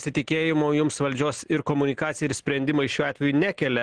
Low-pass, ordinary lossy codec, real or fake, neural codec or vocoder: 9.9 kHz; Opus, 16 kbps; real; none